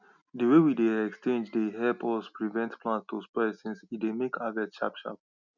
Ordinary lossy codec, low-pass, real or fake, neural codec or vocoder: none; 7.2 kHz; real; none